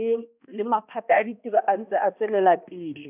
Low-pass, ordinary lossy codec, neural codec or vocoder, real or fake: 3.6 kHz; none; codec, 16 kHz, 1 kbps, X-Codec, HuBERT features, trained on balanced general audio; fake